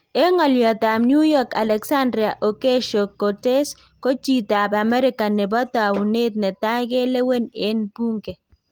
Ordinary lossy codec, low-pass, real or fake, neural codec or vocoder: Opus, 24 kbps; 19.8 kHz; real; none